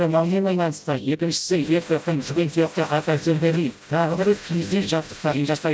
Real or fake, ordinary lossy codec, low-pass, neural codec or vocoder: fake; none; none; codec, 16 kHz, 0.5 kbps, FreqCodec, smaller model